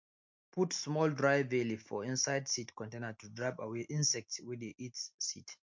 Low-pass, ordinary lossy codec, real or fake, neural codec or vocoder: 7.2 kHz; MP3, 48 kbps; real; none